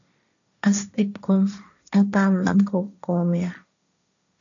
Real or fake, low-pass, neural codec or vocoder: fake; 7.2 kHz; codec, 16 kHz, 1.1 kbps, Voila-Tokenizer